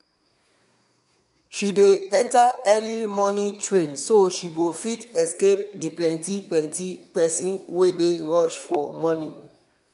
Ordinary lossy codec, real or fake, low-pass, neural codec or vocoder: none; fake; 10.8 kHz; codec, 24 kHz, 1 kbps, SNAC